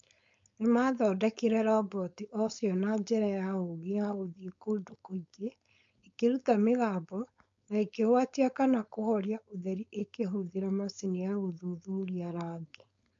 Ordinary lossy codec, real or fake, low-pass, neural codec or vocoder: MP3, 48 kbps; fake; 7.2 kHz; codec, 16 kHz, 4.8 kbps, FACodec